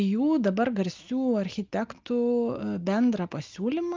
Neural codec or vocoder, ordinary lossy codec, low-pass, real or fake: none; Opus, 24 kbps; 7.2 kHz; real